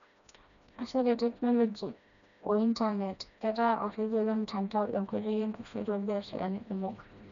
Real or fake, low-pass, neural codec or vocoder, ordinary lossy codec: fake; 7.2 kHz; codec, 16 kHz, 1 kbps, FreqCodec, smaller model; none